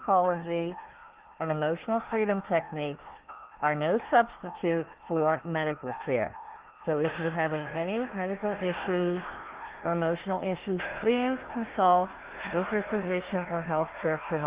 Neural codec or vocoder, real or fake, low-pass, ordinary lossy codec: codec, 16 kHz, 1 kbps, FunCodec, trained on Chinese and English, 50 frames a second; fake; 3.6 kHz; Opus, 16 kbps